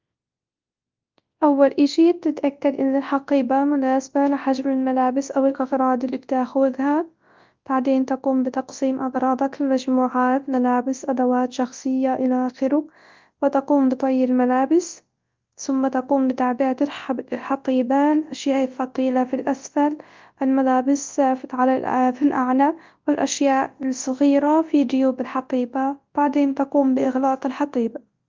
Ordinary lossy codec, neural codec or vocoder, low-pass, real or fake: Opus, 32 kbps; codec, 24 kHz, 0.9 kbps, WavTokenizer, large speech release; 7.2 kHz; fake